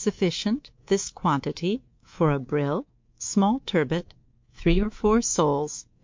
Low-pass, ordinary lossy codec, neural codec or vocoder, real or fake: 7.2 kHz; MP3, 48 kbps; codec, 24 kHz, 3.1 kbps, DualCodec; fake